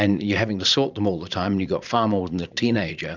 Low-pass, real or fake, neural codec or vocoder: 7.2 kHz; fake; vocoder, 44.1 kHz, 80 mel bands, Vocos